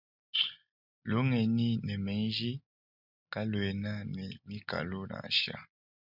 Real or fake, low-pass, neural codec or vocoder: real; 5.4 kHz; none